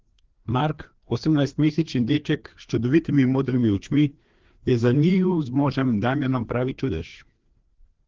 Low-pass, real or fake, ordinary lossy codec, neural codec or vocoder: 7.2 kHz; fake; Opus, 16 kbps; codec, 16 kHz, 2 kbps, FreqCodec, larger model